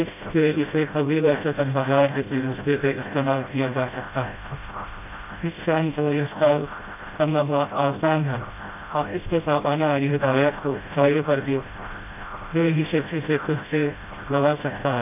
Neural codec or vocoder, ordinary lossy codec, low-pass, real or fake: codec, 16 kHz, 0.5 kbps, FreqCodec, smaller model; none; 3.6 kHz; fake